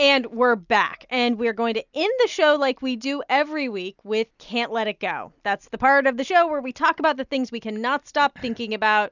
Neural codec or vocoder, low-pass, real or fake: none; 7.2 kHz; real